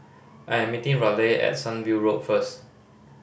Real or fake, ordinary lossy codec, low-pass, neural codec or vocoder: real; none; none; none